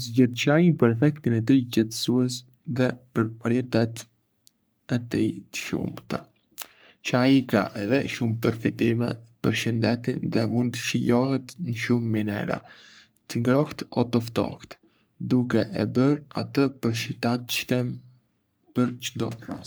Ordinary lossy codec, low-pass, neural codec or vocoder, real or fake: none; none; codec, 44.1 kHz, 3.4 kbps, Pupu-Codec; fake